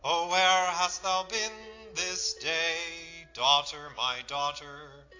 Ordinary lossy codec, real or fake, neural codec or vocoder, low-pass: AAC, 48 kbps; real; none; 7.2 kHz